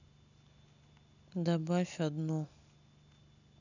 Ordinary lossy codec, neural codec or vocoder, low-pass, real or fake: none; none; 7.2 kHz; real